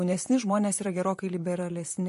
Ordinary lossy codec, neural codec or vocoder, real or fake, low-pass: MP3, 48 kbps; vocoder, 44.1 kHz, 128 mel bands, Pupu-Vocoder; fake; 14.4 kHz